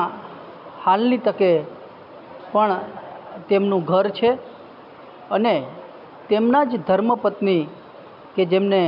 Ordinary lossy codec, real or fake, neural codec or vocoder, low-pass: none; real; none; 5.4 kHz